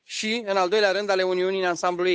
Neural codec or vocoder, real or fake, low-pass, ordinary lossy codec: codec, 16 kHz, 8 kbps, FunCodec, trained on Chinese and English, 25 frames a second; fake; none; none